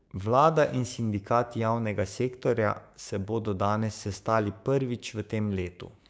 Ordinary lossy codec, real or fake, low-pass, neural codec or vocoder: none; fake; none; codec, 16 kHz, 6 kbps, DAC